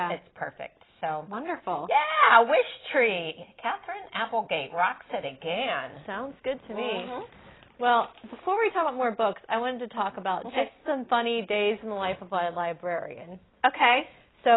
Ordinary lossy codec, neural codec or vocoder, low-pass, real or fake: AAC, 16 kbps; none; 7.2 kHz; real